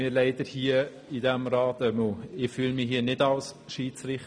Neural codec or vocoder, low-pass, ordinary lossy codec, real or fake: none; none; none; real